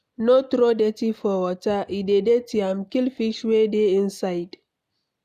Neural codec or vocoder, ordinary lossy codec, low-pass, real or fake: none; none; 14.4 kHz; real